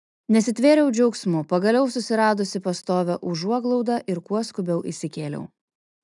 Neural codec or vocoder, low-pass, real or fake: none; 10.8 kHz; real